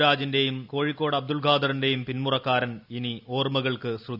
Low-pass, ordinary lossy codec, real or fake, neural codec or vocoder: 5.4 kHz; none; real; none